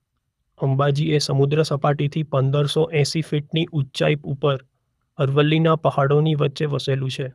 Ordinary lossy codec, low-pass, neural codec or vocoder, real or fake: none; none; codec, 24 kHz, 6 kbps, HILCodec; fake